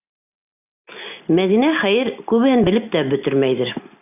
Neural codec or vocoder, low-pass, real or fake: none; 3.6 kHz; real